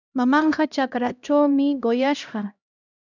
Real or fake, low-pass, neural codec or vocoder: fake; 7.2 kHz; codec, 16 kHz, 1 kbps, X-Codec, HuBERT features, trained on LibriSpeech